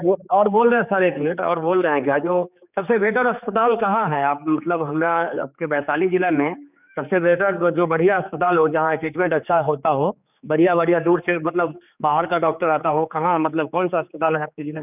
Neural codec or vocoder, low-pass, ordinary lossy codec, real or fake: codec, 16 kHz, 4 kbps, X-Codec, HuBERT features, trained on general audio; 3.6 kHz; none; fake